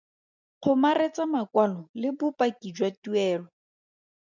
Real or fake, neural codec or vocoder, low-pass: real; none; 7.2 kHz